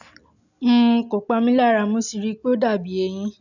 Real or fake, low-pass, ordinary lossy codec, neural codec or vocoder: real; 7.2 kHz; none; none